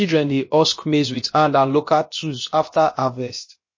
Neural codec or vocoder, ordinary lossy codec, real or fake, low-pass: codec, 16 kHz, about 1 kbps, DyCAST, with the encoder's durations; MP3, 32 kbps; fake; 7.2 kHz